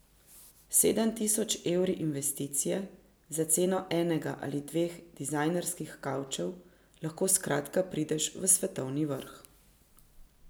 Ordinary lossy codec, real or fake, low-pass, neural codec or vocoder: none; real; none; none